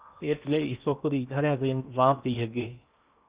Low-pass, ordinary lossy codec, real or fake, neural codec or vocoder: 3.6 kHz; Opus, 64 kbps; fake; codec, 16 kHz in and 24 kHz out, 0.8 kbps, FocalCodec, streaming, 65536 codes